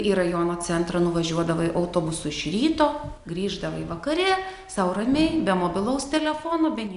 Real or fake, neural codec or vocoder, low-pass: real; none; 10.8 kHz